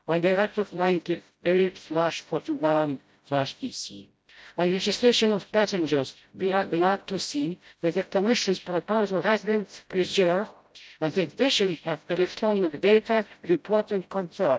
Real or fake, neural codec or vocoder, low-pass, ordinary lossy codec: fake; codec, 16 kHz, 0.5 kbps, FreqCodec, smaller model; none; none